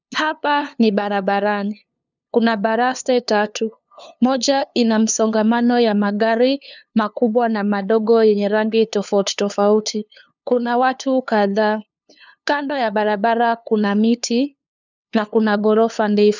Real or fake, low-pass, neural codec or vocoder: fake; 7.2 kHz; codec, 16 kHz, 2 kbps, FunCodec, trained on LibriTTS, 25 frames a second